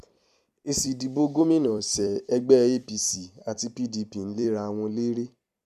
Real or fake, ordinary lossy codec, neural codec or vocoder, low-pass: fake; none; vocoder, 44.1 kHz, 128 mel bands every 256 samples, BigVGAN v2; 14.4 kHz